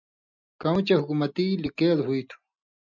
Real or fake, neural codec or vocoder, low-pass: real; none; 7.2 kHz